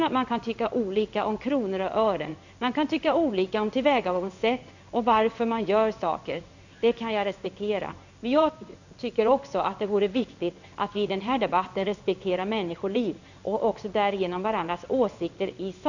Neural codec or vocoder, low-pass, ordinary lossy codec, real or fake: codec, 16 kHz in and 24 kHz out, 1 kbps, XY-Tokenizer; 7.2 kHz; none; fake